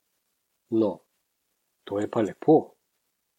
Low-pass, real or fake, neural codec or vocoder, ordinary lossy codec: 19.8 kHz; real; none; MP3, 64 kbps